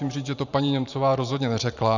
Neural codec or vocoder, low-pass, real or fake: vocoder, 44.1 kHz, 128 mel bands every 512 samples, BigVGAN v2; 7.2 kHz; fake